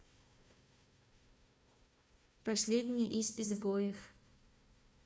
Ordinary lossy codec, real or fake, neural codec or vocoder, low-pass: none; fake; codec, 16 kHz, 1 kbps, FunCodec, trained on Chinese and English, 50 frames a second; none